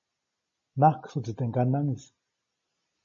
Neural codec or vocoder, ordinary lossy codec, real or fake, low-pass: none; MP3, 32 kbps; real; 7.2 kHz